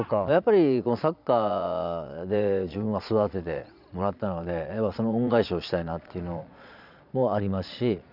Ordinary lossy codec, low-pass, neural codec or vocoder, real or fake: none; 5.4 kHz; vocoder, 22.05 kHz, 80 mel bands, Vocos; fake